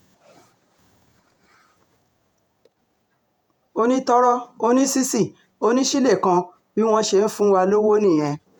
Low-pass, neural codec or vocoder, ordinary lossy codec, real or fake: 19.8 kHz; vocoder, 48 kHz, 128 mel bands, Vocos; none; fake